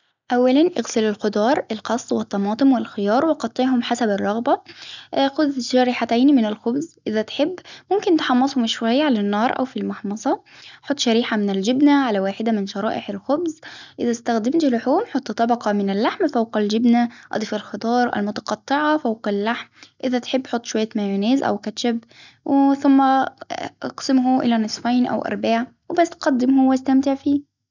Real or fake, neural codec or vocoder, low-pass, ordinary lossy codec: real; none; 7.2 kHz; none